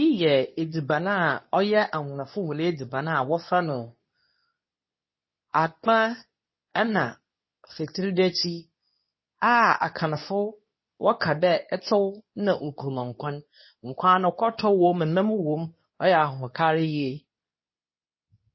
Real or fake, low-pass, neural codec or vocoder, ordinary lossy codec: fake; 7.2 kHz; codec, 24 kHz, 0.9 kbps, WavTokenizer, medium speech release version 2; MP3, 24 kbps